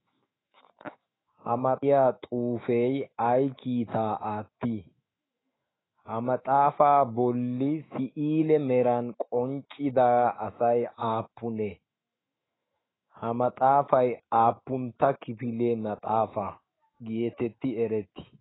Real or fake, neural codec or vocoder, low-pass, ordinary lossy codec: fake; autoencoder, 48 kHz, 128 numbers a frame, DAC-VAE, trained on Japanese speech; 7.2 kHz; AAC, 16 kbps